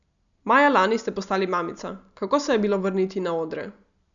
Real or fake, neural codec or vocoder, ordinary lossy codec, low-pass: real; none; none; 7.2 kHz